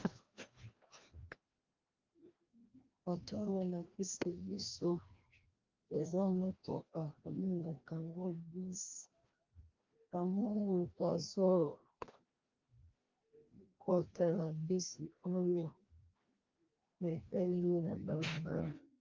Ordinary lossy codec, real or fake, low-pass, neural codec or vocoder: Opus, 32 kbps; fake; 7.2 kHz; codec, 16 kHz, 1 kbps, FreqCodec, larger model